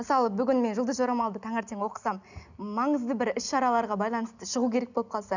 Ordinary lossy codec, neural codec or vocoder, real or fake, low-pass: none; none; real; 7.2 kHz